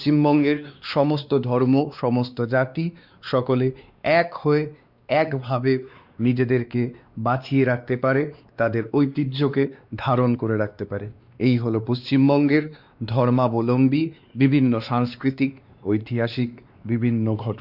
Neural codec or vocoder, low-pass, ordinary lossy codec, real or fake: codec, 16 kHz, 2 kbps, X-Codec, WavLM features, trained on Multilingual LibriSpeech; 5.4 kHz; Opus, 64 kbps; fake